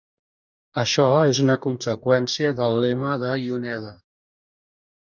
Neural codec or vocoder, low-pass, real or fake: codec, 44.1 kHz, 2.6 kbps, DAC; 7.2 kHz; fake